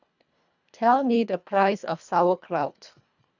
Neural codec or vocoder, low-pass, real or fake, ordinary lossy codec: codec, 24 kHz, 1.5 kbps, HILCodec; 7.2 kHz; fake; none